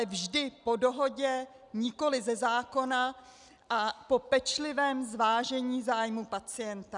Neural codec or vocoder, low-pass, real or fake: none; 10.8 kHz; real